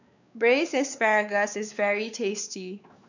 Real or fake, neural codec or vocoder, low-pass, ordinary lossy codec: fake; codec, 16 kHz, 4 kbps, X-Codec, WavLM features, trained on Multilingual LibriSpeech; 7.2 kHz; none